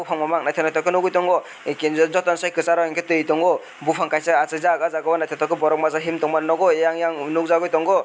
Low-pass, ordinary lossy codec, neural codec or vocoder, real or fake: none; none; none; real